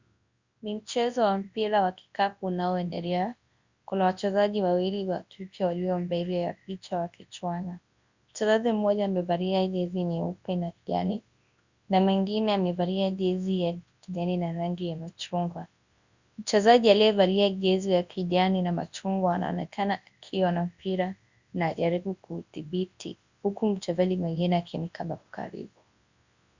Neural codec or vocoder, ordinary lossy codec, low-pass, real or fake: codec, 24 kHz, 0.9 kbps, WavTokenizer, large speech release; Opus, 64 kbps; 7.2 kHz; fake